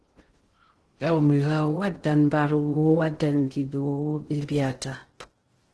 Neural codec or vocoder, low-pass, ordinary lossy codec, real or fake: codec, 16 kHz in and 24 kHz out, 0.6 kbps, FocalCodec, streaming, 2048 codes; 10.8 kHz; Opus, 16 kbps; fake